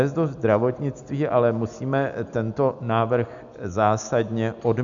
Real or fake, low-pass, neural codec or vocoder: real; 7.2 kHz; none